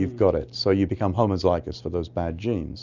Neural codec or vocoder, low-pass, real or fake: none; 7.2 kHz; real